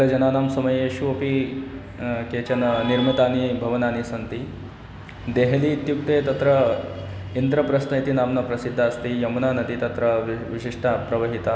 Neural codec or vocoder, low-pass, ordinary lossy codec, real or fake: none; none; none; real